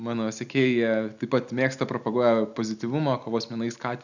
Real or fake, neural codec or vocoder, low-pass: real; none; 7.2 kHz